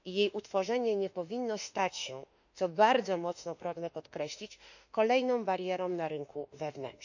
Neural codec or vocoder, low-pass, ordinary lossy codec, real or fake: autoencoder, 48 kHz, 32 numbers a frame, DAC-VAE, trained on Japanese speech; 7.2 kHz; none; fake